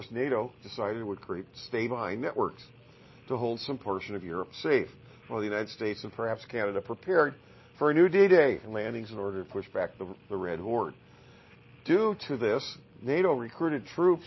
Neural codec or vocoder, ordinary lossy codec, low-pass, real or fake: none; MP3, 24 kbps; 7.2 kHz; real